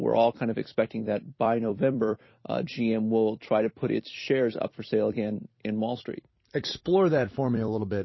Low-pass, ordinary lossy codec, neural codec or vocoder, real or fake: 7.2 kHz; MP3, 24 kbps; vocoder, 44.1 kHz, 128 mel bands every 256 samples, BigVGAN v2; fake